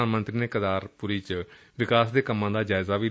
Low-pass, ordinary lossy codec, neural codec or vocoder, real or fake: none; none; none; real